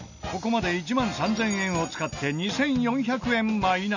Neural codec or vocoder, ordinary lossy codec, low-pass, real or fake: none; none; 7.2 kHz; real